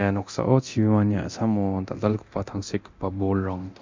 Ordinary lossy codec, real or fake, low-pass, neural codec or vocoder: MP3, 64 kbps; fake; 7.2 kHz; codec, 24 kHz, 0.9 kbps, DualCodec